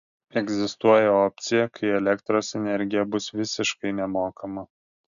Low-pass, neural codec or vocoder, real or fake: 7.2 kHz; none; real